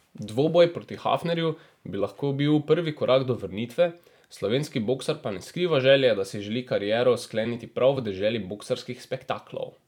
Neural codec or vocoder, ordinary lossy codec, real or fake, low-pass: vocoder, 44.1 kHz, 128 mel bands every 256 samples, BigVGAN v2; none; fake; 19.8 kHz